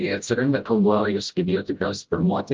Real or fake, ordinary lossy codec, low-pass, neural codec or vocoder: fake; Opus, 24 kbps; 7.2 kHz; codec, 16 kHz, 0.5 kbps, FreqCodec, smaller model